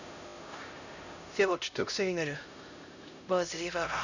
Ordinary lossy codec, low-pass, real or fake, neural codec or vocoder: none; 7.2 kHz; fake; codec, 16 kHz, 0.5 kbps, X-Codec, HuBERT features, trained on LibriSpeech